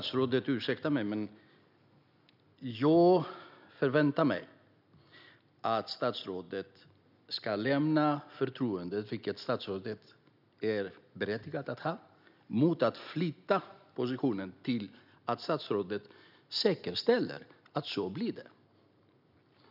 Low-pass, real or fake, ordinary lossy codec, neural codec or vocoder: 5.4 kHz; real; none; none